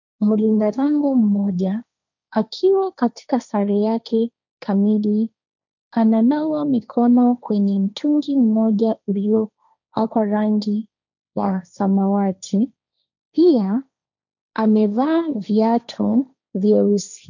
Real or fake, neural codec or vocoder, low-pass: fake; codec, 16 kHz, 1.1 kbps, Voila-Tokenizer; 7.2 kHz